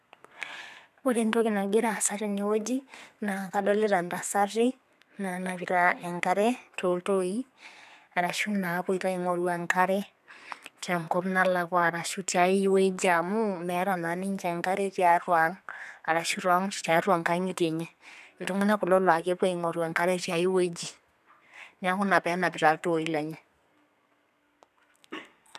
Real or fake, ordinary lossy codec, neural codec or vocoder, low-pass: fake; none; codec, 32 kHz, 1.9 kbps, SNAC; 14.4 kHz